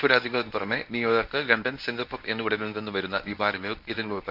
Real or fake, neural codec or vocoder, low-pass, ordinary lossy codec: fake; codec, 24 kHz, 0.9 kbps, WavTokenizer, medium speech release version 1; 5.4 kHz; none